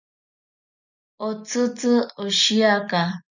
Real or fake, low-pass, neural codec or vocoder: real; 7.2 kHz; none